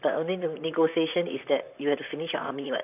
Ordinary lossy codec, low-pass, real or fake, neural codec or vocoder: none; 3.6 kHz; fake; vocoder, 44.1 kHz, 128 mel bands, Pupu-Vocoder